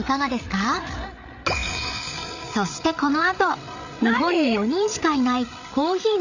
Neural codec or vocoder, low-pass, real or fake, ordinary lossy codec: codec, 16 kHz, 8 kbps, FreqCodec, larger model; 7.2 kHz; fake; none